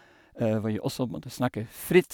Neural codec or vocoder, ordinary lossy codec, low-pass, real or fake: none; none; none; real